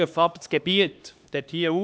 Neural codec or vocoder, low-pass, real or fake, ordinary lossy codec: codec, 16 kHz, 2 kbps, X-Codec, HuBERT features, trained on LibriSpeech; none; fake; none